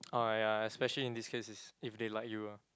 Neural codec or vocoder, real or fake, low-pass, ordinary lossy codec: none; real; none; none